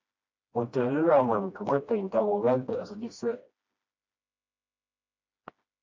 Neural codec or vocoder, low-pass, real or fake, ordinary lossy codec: codec, 16 kHz, 1 kbps, FreqCodec, smaller model; 7.2 kHz; fake; MP3, 64 kbps